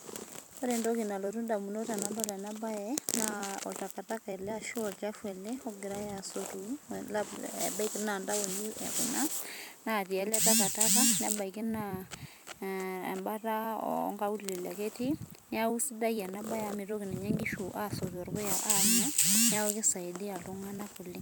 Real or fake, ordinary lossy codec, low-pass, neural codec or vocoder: fake; none; none; vocoder, 44.1 kHz, 128 mel bands every 256 samples, BigVGAN v2